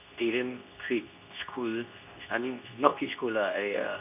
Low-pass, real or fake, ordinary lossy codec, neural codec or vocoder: 3.6 kHz; fake; none; codec, 24 kHz, 0.9 kbps, WavTokenizer, medium speech release version 2